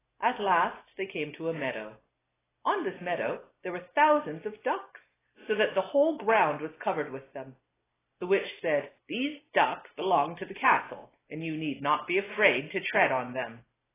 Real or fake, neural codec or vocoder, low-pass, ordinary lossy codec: real; none; 3.6 kHz; AAC, 16 kbps